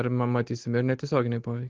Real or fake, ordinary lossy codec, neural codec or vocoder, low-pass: real; Opus, 16 kbps; none; 7.2 kHz